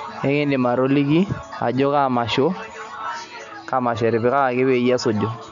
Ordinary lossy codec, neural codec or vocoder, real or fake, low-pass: none; none; real; 7.2 kHz